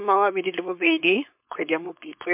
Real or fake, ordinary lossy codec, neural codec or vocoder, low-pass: fake; MP3, 32 kbps; codec, 16 kHz, 4.8 kbps, FACodec; 3.6 kHz